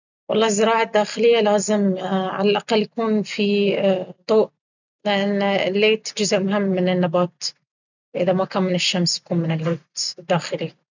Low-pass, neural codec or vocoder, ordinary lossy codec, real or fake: 7.2 kHz; none; none; real